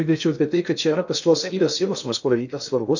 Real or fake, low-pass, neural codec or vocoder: fake; 7.2 kHz; codec, 16 kHz in and 24 kHz out, 0.6 kbps, FocalCodec, streaming, 2048 codes